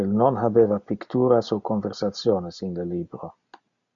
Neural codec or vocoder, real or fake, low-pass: none; real; 7.2 kHz